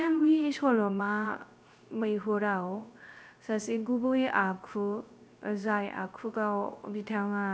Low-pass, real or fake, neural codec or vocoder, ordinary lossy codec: none; fake; codec, 16 kHz, 0.3 kbps, FocalCodec; none